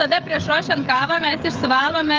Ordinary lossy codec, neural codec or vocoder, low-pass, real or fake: Opus, 24 kbps; codec, 16 kHz, 8 kbps, FreqCodec, smaller model; 7.2 kHz; fake